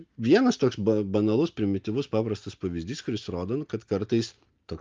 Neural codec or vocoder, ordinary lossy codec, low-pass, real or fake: none; Opus, 24 kbps; 7.2 kHz; real